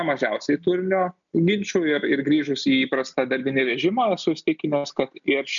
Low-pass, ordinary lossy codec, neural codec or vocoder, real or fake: 7.2 kHz; MP3, 96 kbps; none; real